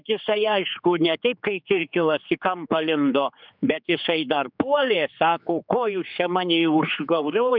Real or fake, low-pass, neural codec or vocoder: fake; 5.4 kHz; codec, 16 kHz, 4 kbps, X-Codec, HuBERT features, trained on general audio